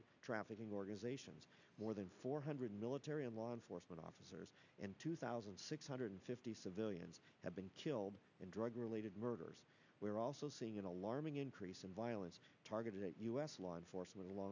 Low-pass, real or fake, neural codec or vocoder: 7.2 kHz; real; none